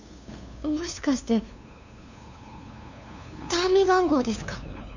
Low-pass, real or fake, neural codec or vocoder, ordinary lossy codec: 7.2 kHz; fake; codec, 16 kHz, 2 kbps, FunCodec, trained on LibriTTS, 25 frames a second; none